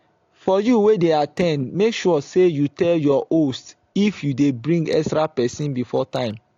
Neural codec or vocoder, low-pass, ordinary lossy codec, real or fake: none; 7.2 kHz; AAC, 48 kbps; real